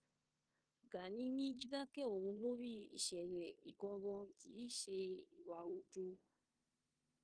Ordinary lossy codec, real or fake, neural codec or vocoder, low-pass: Opus, 24 kbps; fake; codec, 16 kHz in and 24 kHz out, 0.9 kbps, LongCat-Audio-Codec, four codebook decoder; 9.9 kHz